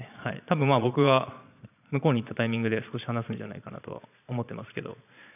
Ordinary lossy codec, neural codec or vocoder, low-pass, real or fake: none; vocoder, 22.05 kHz, 80 mel bands, Vocos; 3.6 kHz; fake